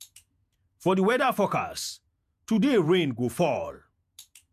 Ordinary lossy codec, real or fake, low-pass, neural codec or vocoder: MP3, 96 kbps; fake; 14.4 kHz; vocoder, 48 kHz, 128 mel bands, Vocos